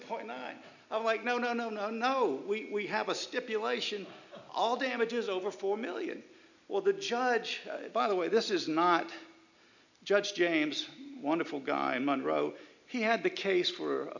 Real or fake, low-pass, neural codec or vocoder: real; 7.2 kHz; none